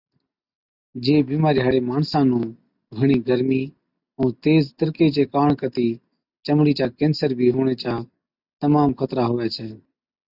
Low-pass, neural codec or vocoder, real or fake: 5.4 kHz; none; real